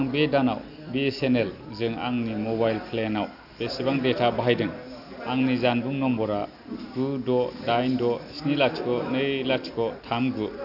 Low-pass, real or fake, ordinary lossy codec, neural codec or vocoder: 5.4 kHz; real; AAC, 48 kbps; none